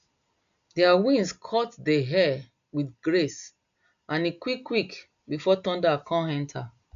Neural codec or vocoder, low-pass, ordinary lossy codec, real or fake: none; 7.2 kHz; none; real